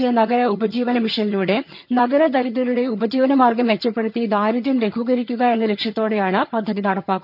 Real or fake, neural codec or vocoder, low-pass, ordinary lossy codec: fake; vocoder, 22.05 kHz, 80 mel bands, HiFi-GAN; 5.4 kHz; none